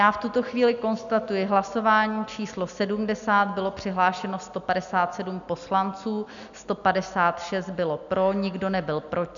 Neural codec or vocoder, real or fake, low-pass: none; real; 7.2 kHz